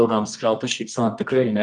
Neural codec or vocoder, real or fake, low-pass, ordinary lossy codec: codec, 24 kHz, 0.9 kbps, WavTokenizer, medium music audio release; fake; 10.8 kHz; Opus, 32 kbps